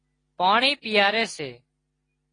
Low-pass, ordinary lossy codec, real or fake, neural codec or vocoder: 9.9 kHz; AAC, 32 kbps; real; none